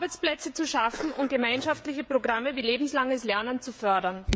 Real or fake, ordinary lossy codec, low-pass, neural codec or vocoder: fake; none; none; codec, 16 kHz, 16 kbps, FreqCodec, smaller model